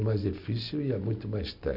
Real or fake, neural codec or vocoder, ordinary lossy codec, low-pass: real; none; none; 5.4 kHz